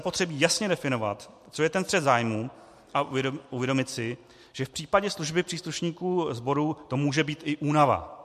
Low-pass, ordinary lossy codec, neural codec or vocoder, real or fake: 14.4 kHz; MP3, 64 kbps; none; real